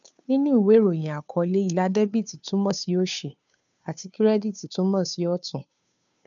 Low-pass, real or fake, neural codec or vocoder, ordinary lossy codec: 7.2 kHz; fake; codec, 16 kHz, 2 kbps, FunCodec, trained on Chinese and English, 25 frames a second; none